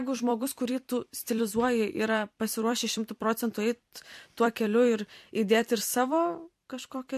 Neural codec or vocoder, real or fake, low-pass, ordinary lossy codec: vocoder, 44.1 kHz, 128 mel bands every 256 samples, BigVGAN v2; fake; 14.4 kHz; MP3, 64 kbps